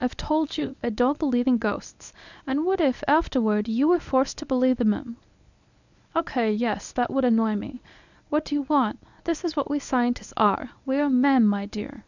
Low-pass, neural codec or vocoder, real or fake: 7.2 kHz; codec, 24 kHz, 0.9 kbps, WavTokenizer, medium speech release version 1; fake